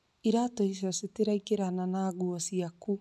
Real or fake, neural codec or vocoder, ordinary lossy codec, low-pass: real; none; none; none